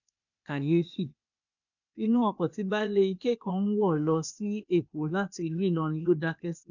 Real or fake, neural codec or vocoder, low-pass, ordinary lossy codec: fake; codec, 16 kHz, 0.8 kbps, ZipCodec; 7.2 kHz; none